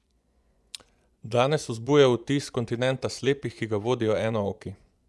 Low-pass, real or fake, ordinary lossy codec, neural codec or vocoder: none; fake; none; vocoder, 24 kHz, 100 mel bands, Vocos